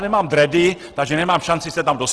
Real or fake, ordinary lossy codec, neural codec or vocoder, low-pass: real; Opus, 16 kbps; none; 10.8 kHz